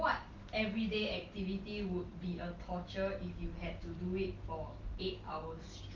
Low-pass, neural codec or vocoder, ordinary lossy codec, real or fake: 7.2 kHz; none; Opus, 32 kbps; real